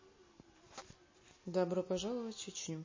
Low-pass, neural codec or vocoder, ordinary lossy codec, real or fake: 7.2 kHz; none; MP3, 32 kbps; real